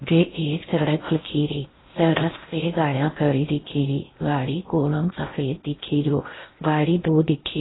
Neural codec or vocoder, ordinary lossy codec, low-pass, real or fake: codec, 16 kHz in and 24 kHz out, 0.6 kbps, FocalCodec, streaming, 4096 codes; AAC, 16 kbps; 7.2 kHz; fake